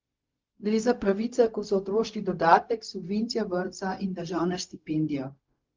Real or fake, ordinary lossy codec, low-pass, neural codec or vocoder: fake; Opus, 32 kbps; 7.2 kHz; codec, 16 kHz, 0.4 kbps, LongCat-Audio-Codec